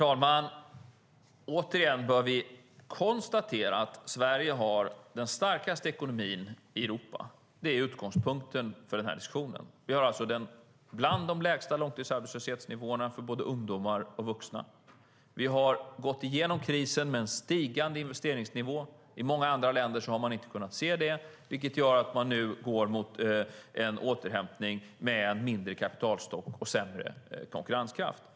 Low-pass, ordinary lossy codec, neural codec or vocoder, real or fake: none; none; none; real